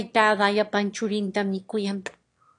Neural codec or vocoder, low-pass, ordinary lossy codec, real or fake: autoencoder, 22.05 kHz, a latent of 192 numbers a frame, VITS, trained on one speaker; 9.9 kHz; AAC, 48 kbps; fake